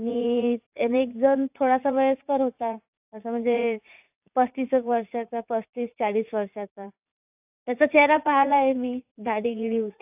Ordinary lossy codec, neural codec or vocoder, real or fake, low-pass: AAC, 32 kbps; vocoder, 44.1 kHz, 80 mel bands, Vocos; fake; 3.6 kHz